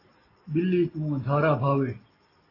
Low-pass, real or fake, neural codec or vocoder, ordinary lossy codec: 5.4 kHz; real; none; AAC, 24 kbps